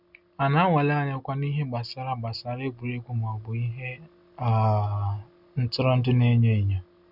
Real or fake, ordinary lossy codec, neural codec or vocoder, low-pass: real; none; none; 5.4 kHz